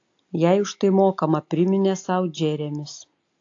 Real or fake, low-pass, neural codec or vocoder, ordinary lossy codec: real; 7.2 kHz; none; AAC, 48 kbps